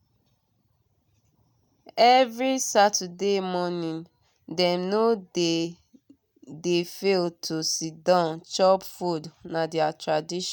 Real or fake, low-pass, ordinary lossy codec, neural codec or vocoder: real; none; none; none